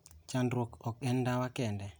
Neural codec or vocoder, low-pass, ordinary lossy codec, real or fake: none; none; none; real